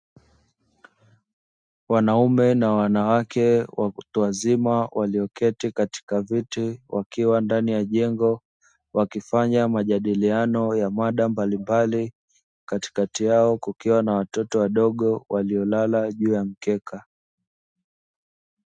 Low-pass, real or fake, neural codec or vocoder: 9.9 kHz; real; none